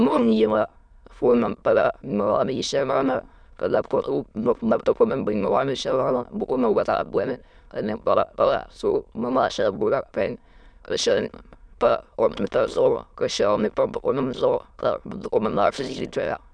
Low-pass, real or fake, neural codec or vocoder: 9.9 kHz; fake; autoencoder, 22.05 kHz, a latent of 192 numbers a frame, VITS, trained on many speakers